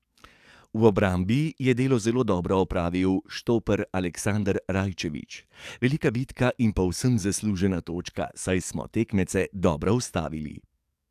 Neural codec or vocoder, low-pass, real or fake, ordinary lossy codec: codec, 44.1 kHz, 7.8 kbps, DAC; 14.4 kHz; fake; AAC, 96 kbps